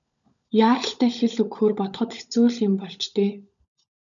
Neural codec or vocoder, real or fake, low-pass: codec, 16 kHz, 16 kbps, FunCodec, trained on LibriTTS, 50 frames a second; fake; 7.2 kHz